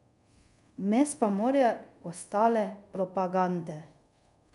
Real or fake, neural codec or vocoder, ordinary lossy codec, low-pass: fake; codec, 24 kHz, 0.5 kbps, DualCodec; none; 10.8 kHz